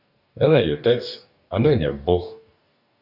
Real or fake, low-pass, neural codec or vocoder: fake; 5.4 kHz; codec, 44.1 kHz, 2.6 kbps, DAC